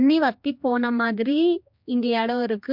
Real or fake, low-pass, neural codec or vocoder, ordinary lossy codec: fake; 5.4 kHz; codec, 16 kHz, 2 kbps, X-Codec, HuBERT features, trained on general audio; MP3, 48 kbps